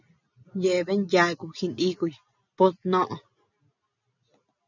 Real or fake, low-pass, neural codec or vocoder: real; 7.2 kHz; none